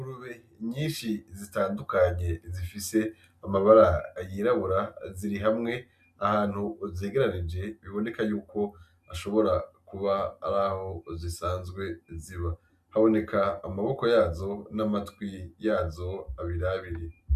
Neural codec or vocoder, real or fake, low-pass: none; real; 14.4 kHz